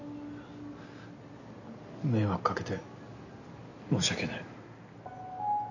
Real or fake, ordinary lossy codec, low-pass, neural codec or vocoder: real; none; 7.2 kHz; none